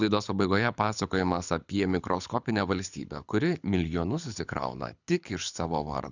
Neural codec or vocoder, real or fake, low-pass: codec, 24 kHz, 6 kbps, HILCodec; fake; 7.2 kHz